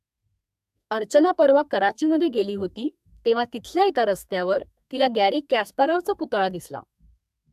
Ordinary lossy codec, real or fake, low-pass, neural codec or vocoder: AAC, 96 kbps; fake; 14.4 kHz; codec, 32 kHz, 1.9 kbps, SNAC